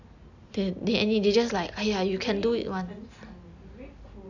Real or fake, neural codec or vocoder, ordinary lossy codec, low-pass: real; none; none; 7.2 kHz